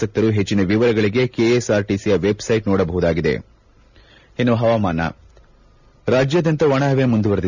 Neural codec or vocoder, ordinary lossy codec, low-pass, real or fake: none; none; 7.2 kHz; real